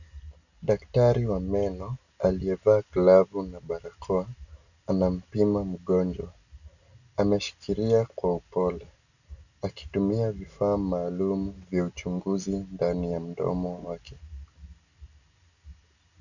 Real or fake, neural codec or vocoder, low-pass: real; none; 7.2 kHz